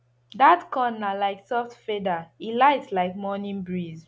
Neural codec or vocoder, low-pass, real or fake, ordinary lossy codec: none; none; real; none